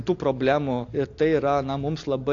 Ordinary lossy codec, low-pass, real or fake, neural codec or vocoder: AAC, 48 kbps; 7.2 kHz; real; none